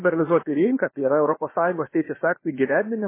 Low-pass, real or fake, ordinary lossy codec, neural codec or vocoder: 3.6 kHz; fake; MP3, 16 kbps; codec, 16 kHz, about 1 kbps, DyCAST, with the encoder's durations